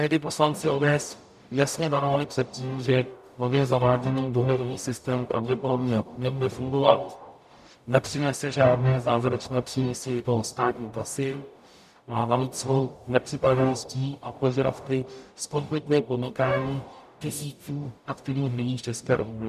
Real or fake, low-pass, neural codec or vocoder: fake; 14.4 kHz; codec, 44.1 kHz, 0.9 kbps, DAC